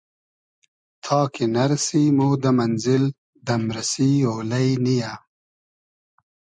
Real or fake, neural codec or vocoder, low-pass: real; none; 9.9 kHz